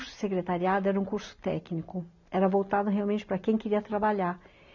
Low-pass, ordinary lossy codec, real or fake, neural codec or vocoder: 7.2 kHz; none; real; none